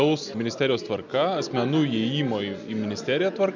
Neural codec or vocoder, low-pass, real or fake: none; 7.2 kHz; real